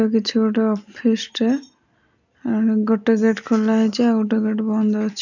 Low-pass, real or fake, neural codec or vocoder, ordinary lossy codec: 7.2 kHz; real; none; none